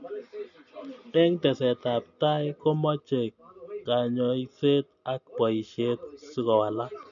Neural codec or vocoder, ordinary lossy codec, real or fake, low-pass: none; none; real; 7.2 kHz